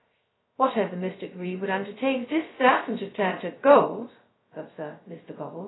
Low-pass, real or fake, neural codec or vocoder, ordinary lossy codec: 7.2 kHz; fake; codec, 16 kHz, 0.2 kbps, FocalCodec; AAC, 16 kbps